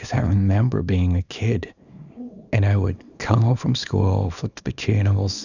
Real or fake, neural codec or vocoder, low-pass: fake; codec, 24 kHz, 0.9 kbps, WavTokenizer, small release; 7.2 kHz